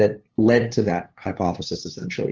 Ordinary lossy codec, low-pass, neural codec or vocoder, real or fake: Opus, 16 kbps; 7.2 kHz; codec, 16 kHz, 2 kbps, FunCodec, trained on LibriTTS, 25 frames a second; fake